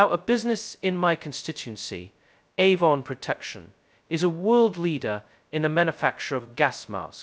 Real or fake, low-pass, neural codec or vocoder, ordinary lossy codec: fake; none; codec, 16 kHz, 0.2 kbps, FocalCodec; none